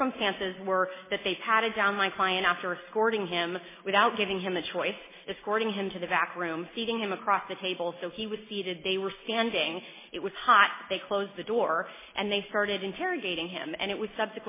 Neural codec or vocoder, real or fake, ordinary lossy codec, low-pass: none; real; MP3, 16 kbps; 3.6 kHz